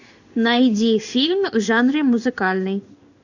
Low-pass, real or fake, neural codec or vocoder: 7.2 kHz; fake; codec, 16 kHz in and 24 kHz out, 1 kbps, XY-Tokenizer